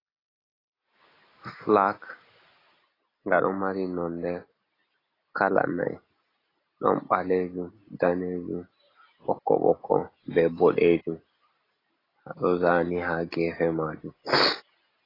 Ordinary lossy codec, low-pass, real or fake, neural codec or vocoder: AAC, 24 kbps; 5.4 kHz; real; none